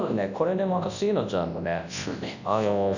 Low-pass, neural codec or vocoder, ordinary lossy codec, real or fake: 7.2 kHz; codec, 24 kHz, 0.9 kbps, WavTokenizer, large speech release; none; fake